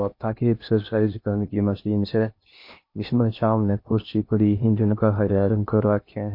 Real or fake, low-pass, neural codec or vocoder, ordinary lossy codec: fake; 5.4 kHz; codec, 16 kHz in and 24 kHz out, 0.6 kbps, FocalCodec, streaming, 2048 codes; MP3, 32 kbps